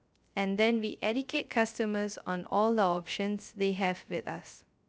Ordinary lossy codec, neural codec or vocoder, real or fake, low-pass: none; codec, 16 kHz, 0.3 kbps, FocalCodec; fake; none